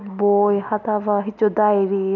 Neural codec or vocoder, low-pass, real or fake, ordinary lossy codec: none; 7.2 kHz; real; none